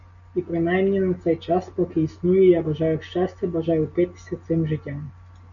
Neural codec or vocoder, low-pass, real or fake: none; 7.2 kHz; real